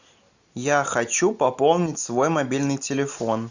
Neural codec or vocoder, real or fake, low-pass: none; real; 7.2 kHz